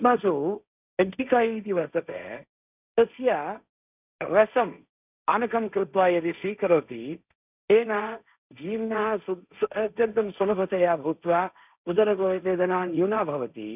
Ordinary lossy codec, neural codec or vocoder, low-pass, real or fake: none; codec, 16 kHz, 1.1 kbps, Voila-Tokenizer; 3.6 kHz; fake